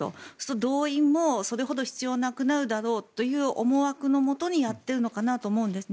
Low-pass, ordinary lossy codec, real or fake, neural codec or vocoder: none; none; real; none